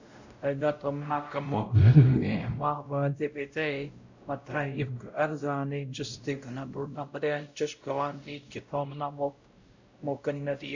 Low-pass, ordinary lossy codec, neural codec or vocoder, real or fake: 7.2 kHz; Opus, 64 kbps; codec, 16 kHz, 0.5 kbps, X-Codec, WavLM features, trained on Multilingual LibriSpeech; fake